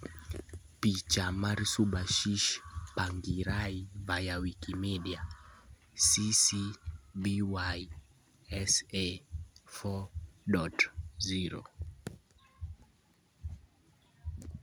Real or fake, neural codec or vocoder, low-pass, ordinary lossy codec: real; none; none; none